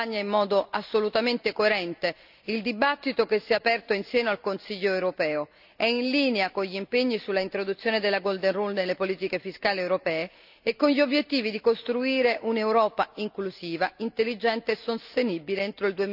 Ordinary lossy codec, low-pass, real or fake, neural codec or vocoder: none; 5.4 kHz; real; none